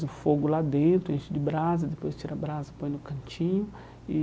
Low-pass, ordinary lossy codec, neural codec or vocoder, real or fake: none; none; none; real